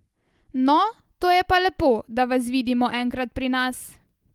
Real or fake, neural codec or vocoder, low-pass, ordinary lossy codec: real; none; 19.8 kHz; Opus, 24 kbps